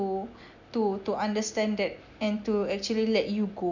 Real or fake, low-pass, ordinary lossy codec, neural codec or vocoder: real; 7.2 kHz; none; none